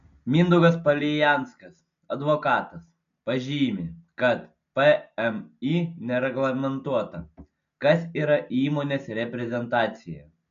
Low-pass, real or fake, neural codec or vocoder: 7.2 kHz; real; none